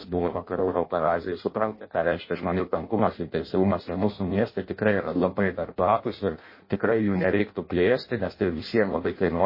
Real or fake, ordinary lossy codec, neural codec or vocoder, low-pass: fake; MP3, 24 kbps; codec, 16 kHz in and 24 kHz out, 0.6 kbps, FireRedTTS-2 codec; 5.4 kHz